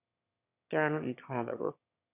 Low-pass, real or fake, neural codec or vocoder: 3.6 kHz; fake; autoencoder, 22.05 kHz, a latent of 192 numbers a frame, VITS, trained on one speaker